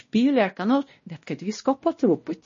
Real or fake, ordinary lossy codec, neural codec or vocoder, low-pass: fake; MP3, 32 kbps; codec, 16 kHz, 1 kbps, X-Codec, WavLM features, trained on Multilingual LibriSpeech; 7.2 kHz